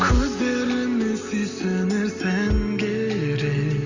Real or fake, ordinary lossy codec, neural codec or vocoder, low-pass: real; none; none; 7.2 kHz